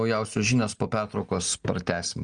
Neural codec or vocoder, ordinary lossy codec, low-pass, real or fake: none; Opus, 32 kbps; 9.9 kHz; real